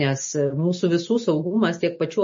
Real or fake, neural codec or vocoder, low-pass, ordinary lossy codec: real; none; 7.2 kHz; MP3, 32 kbps